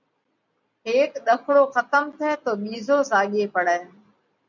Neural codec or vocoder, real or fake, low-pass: none; real; 7.2 kHz